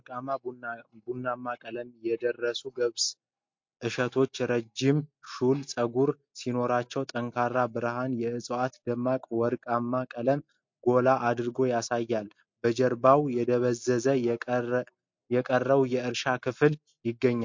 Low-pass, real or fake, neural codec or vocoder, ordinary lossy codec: 7.2 kHz; real; none; MP3, 48 kbps